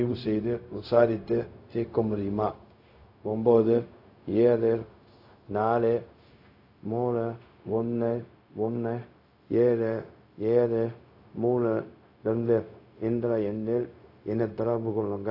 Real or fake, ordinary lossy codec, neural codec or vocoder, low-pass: fake; AAC, 32 kbps; codec, 16 kHz, 0.4 kbps, LongCat-Audio-Codec; 5.4 kHz